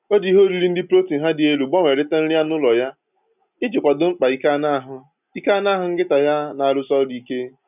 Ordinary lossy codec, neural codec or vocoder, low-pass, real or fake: none; none; 3.6 kHz; real